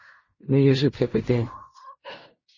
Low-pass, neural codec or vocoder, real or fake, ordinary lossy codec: 7.2 kHz; codec, 16 kHz in and 24 kHz out, 0.4 kbps, LongCat-Audio-Codec, fine tuned four codebook decoder; fake; MP3, 32 kbps